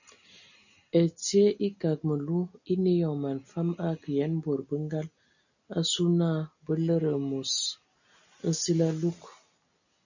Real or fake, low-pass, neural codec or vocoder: real; 7.2 kHz; none